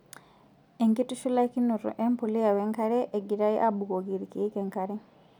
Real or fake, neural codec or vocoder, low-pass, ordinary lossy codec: real; none; 19.8 kHz; none